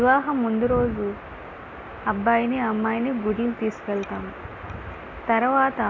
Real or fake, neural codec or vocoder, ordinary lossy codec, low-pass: real; none; MP3, 32 kbps; 7.2 kHz